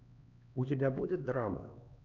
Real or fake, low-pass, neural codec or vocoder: fake; 7.2 kHz; codec, 16 kHz, 2 kbps, X-Codec, HuBERT features, trained on LibriSpeech